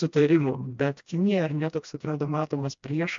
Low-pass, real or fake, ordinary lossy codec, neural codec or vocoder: 7.2 kHz; fake; MP3, 64 kbps; codec, 16 kHz, 1 kbps, FreqCodec, smaller model